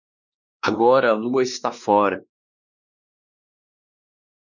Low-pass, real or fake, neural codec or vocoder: 7.2 kHz; fake; codec, 16 kHz, 2 kbps, X-Codec, WavLM features, trained on Multilingual LibriSpeech